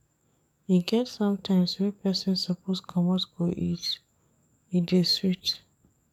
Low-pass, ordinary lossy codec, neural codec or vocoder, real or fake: 19.8 kHz; none; codec, 44.1 kHz, 7.8 kbps, DAC; fake